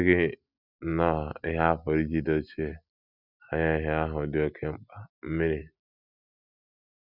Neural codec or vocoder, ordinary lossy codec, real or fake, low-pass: none; none; real; 5.4 kHz